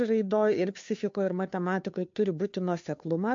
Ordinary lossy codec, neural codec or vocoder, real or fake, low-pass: MP3, 48 kbps; codec, 16 kHz, 2 kbps, FunCodec, trained on Chinese and English, 25 frames a second; fake; 7.2 kHz